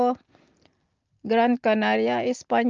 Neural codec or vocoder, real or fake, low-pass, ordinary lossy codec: none; real; 7.2 kHz; Opus, 24 kbps